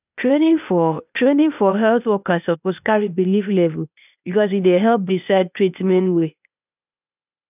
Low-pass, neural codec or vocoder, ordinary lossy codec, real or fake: 3.6 kHz; codec, 16 kHz, 0.8 kbps, ZipCodec; none; fake